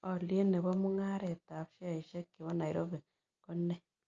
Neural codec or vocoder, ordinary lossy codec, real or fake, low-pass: none; Opus, 24 kbps; real; 7.2 kHz